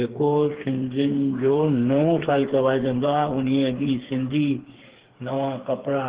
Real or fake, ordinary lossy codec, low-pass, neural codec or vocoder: fake; Opus, 16 kbps; 3.6 kHz; codec, 16 kHz, 4 kbps, FreqCodec, smaller model